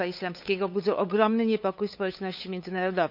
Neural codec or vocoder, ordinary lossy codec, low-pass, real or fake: codec, 16 kHz, 8 kbps, FunCodec, trained on LibriTTS, 25 frames a second; none; 5.4 kHz; fake